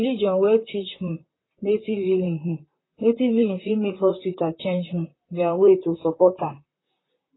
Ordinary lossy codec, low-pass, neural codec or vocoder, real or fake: AAC, 16 kbps; 7.2 kHz; vocoder, 44.1 kHz, 128 mel bands, Pupu-Vocoder; fake